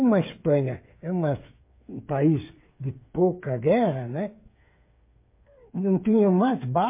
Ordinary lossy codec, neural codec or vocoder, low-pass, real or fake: MP3, 24 kbps; codec, 16 kHz, 8 kbps, FreqCodec, smaller model; 3.6 kHz; fake